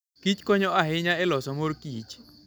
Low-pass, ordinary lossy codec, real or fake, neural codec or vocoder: none; none; real; none